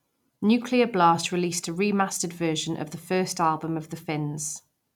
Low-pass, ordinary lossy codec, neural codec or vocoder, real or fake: 19.8 kHz; none; none; real